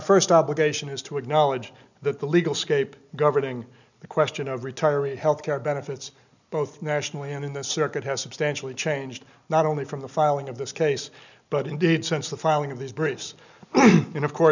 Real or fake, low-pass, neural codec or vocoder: real; 7.2 kHz; none